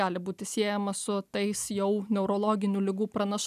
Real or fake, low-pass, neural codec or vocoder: real; 14.4 kHz; none